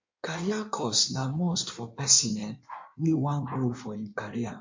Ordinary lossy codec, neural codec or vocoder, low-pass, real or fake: MP3, 48 kbps; codec, 16 kHz in and 24 kHz out, 1.1 kbps, FireRedTTS-2 codec; 7.2 kHz; fake